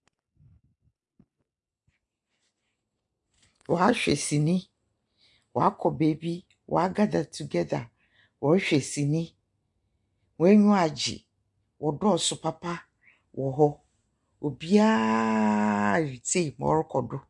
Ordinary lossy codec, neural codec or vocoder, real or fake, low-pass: MP3, 64 kbps; none; real; 10.8 kHz